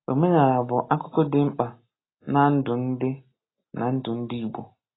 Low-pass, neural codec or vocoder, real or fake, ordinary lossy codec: 7.2 kHz; none; real; AAC, 16 kbps